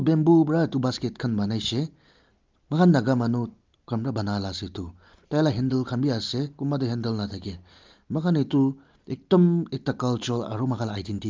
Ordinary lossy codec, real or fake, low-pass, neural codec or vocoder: Opus, 24 kbps; real; 7.2 kHz; none